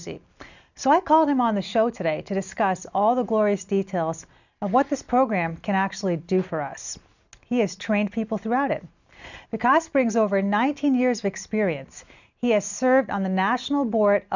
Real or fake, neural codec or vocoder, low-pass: real; none; 7.2 kHz